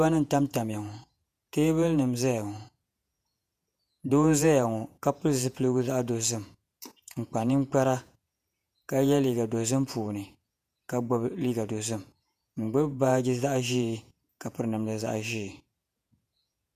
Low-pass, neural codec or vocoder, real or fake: 14.4 kHz; vocoder, 48 kHz, 128 mel bands, Vocos; fake